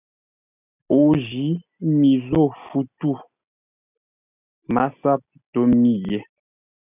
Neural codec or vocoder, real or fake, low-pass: none; real; 3.6 kHz